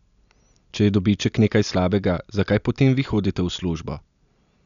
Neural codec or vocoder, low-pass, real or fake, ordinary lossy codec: none; 7.2 kHz; real; none